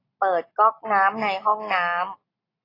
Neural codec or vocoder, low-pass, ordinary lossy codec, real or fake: none; 5.4 kHz; AAC, 24 kbps; real